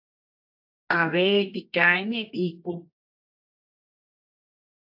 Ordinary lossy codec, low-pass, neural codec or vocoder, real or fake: AAC, 48 kbps; 5.4 kHz; codec, 24 kHz, 0.9 kbps, WavTokenizer, medium music audio release; fake